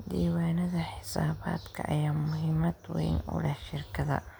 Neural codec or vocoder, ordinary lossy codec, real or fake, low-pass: none; none; real; none